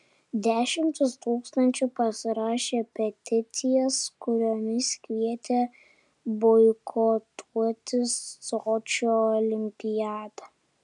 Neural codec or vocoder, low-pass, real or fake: none; 10.8 kHz; real